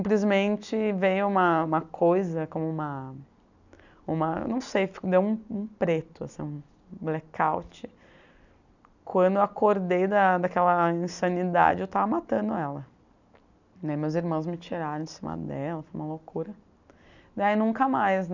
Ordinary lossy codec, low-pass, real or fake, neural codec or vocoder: none; 7.2 kHz; real; none